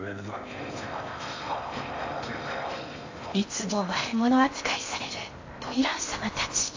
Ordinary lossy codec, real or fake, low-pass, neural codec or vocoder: AAC, 48 kbps; fake; 7.2 kHz; codec, 16 kHz in and 24 kHz out, 0.6 kbps, FocalCodec, streaming, 4096 codes